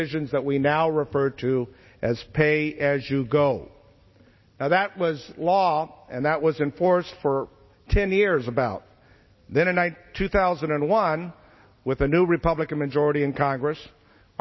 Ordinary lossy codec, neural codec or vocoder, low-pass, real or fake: MP3, 24 kbps; none; 7.2 kHz; real